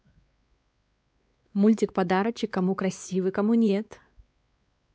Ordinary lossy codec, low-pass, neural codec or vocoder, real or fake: none; none; codec, 16 kHz, 4 kbps, X-Codec, WavLM features, trained on Multilingual LibriSpeech; fake